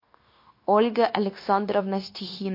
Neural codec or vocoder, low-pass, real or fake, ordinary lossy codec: codec, 16 kHz, 0.9 kbps, LongCat-Audio-Codec; 5.4 kHz; fake; MP3, 32 kbps